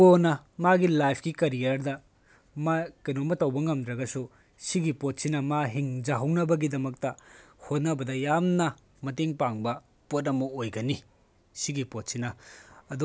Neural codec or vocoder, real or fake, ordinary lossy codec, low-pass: none; real; none; none